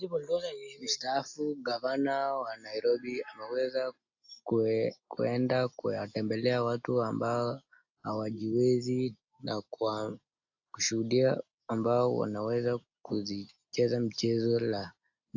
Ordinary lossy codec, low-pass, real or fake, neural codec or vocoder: AAC, 48 kbps; 7.2 kHz; real; none